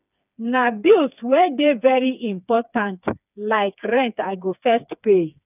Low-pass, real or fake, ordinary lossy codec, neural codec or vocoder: 3.6 kHz; fake; none; codec, 16 kHz, 4 kbps, FreqCodec, smaller model